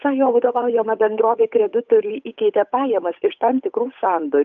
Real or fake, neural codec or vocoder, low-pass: fake; codec, 16 kHz, 8 kbps, FunCodec, trained on Chinese and English, 25 frames a second; 7.2 kHz